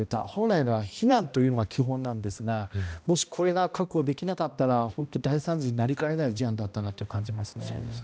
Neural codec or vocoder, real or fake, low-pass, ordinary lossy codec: codec, 16 kHz, 1 kbps, X-Codec, HuBERT features, trained on balanced general audio; fake; none; none